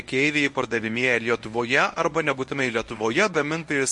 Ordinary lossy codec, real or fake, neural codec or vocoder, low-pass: MP3, 48 kbps; fake; codec, 24 kHz, 0.9 kbps, WavTokenizer, medium speech release version 1; 10.8 kHz